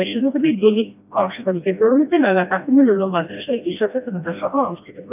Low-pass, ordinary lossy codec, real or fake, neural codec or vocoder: 3.6 kHz; none; fake; codec, 16 kHz, 1 kbps, FreqCodec, smaller model